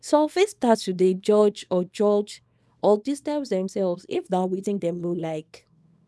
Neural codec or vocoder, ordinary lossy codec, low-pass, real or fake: codec, 24 kHz, 0.9 kbps, WavTokenizer, small release; none; none; fake